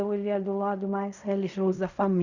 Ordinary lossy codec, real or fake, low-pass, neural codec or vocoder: none; fake; 7.2 kHz; codec, 16 kHz in and 24 kHz out, 0.4 kbps, LongCat-Audio-Codec, fine tuned four codebook decoder